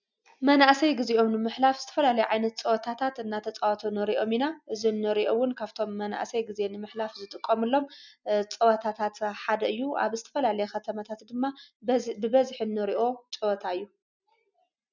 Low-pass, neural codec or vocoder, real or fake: 7.2 kHz; none; real